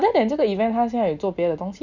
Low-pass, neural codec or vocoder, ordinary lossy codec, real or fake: 7.2 kHz; none; none; real